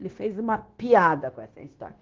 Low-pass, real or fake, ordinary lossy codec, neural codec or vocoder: 7.2 kHz; fake; Opus, 16 kbps; codec, 16 kHz, 0.9 kbps, LongCat-Audio-Codec